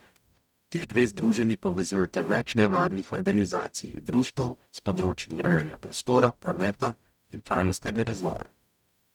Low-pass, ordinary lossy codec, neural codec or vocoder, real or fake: 19.8 kHz; none; codec, 44.1 kHz, 0.9 kbps, DAC; fake